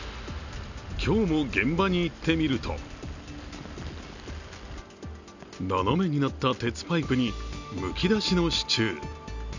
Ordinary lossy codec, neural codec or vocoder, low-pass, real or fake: none; none; 7.2 kHz; real